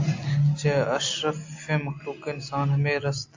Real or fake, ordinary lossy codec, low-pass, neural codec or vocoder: real; AAC, 48 kbps; 7.2 kHz; none